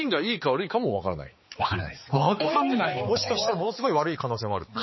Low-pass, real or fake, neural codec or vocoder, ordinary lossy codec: 7.2 kHz; fake; codec, 16 kHz, 4 kbps, X-Codec, HuBERT features, trained on balanced general audio; MP3, 24 kbps